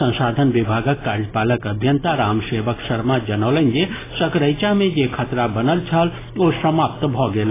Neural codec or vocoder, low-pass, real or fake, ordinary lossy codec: none; 3.6 kHz; real; AAC, 16 kbps